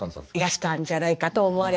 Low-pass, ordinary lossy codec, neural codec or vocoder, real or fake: none; none; codec, 16 kHz, 2 kbps, X-Codec, HuBERT features, trained on balanced general audio; fake